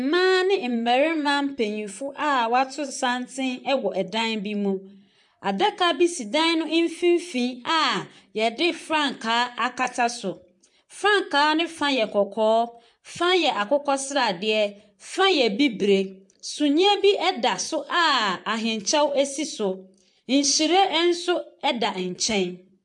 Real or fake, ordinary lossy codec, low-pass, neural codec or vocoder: fake; MP3, 64 kbps; 10.8 kHz; vocoder, 44.1 kHz, 128 mel bands, Pupu-Vocoder